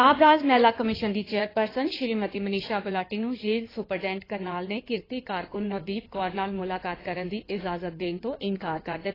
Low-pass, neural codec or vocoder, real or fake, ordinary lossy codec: 5.4 kHz; codec, 16 kHz in and 24 kHz out, 2.2 kbps, FireRedTTS-2 codec; fake; AAC, 24 kbps